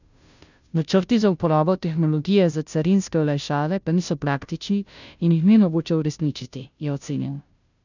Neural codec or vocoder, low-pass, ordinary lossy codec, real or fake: codec, 16 kHz, 0.5 kbps, FunCodec, trained on Chinese and English, 25 frames a second; 7.2 kHz; none; fake